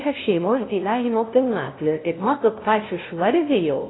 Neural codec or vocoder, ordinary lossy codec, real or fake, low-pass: codec, 16 kHz, 0.5 kbps, FunCodec, trained on LibriTTS, 25 frames a second; AAC, 16 kbps; fake; 7.2 kHz